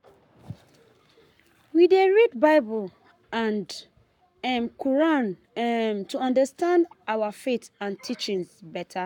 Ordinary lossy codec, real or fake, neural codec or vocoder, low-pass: none; fake; codec, 44.1 kHz, 7.8 kbps, Pupu-Codec; 19.8 kHz